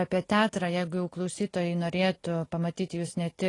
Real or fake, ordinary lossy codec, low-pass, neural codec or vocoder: real; AAC, 32 kbps; 10.8 kHz; none